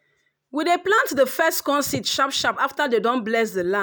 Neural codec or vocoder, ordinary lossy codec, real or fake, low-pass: none; none; real; none